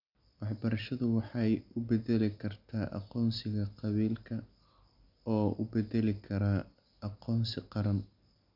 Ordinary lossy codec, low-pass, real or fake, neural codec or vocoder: none; 5.4 kHz; real; none